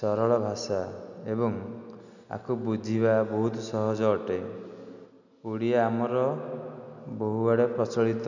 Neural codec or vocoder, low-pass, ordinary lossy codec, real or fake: none; 7.2 kHz; none; real